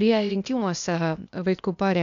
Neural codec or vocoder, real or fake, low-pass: codec, 16 kHz, 0.8 kbps, ZipCodec; fake; 7.2 kHz